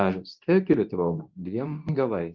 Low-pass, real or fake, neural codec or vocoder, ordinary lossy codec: 7.2 kHz; fake; codec, 24 kHz, 0.9 kbps, WavTokenizer, medium speech release version 2; Opus, 32 kbps